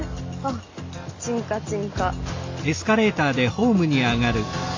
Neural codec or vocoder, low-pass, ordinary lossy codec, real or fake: none; 7.2 kHz; AAC, 48 kbps; real